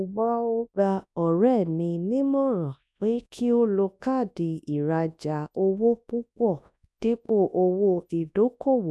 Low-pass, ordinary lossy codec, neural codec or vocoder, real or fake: none; none; codec, 24 kHz, 0.9 kbps, WavTokenizer, large speech release; fake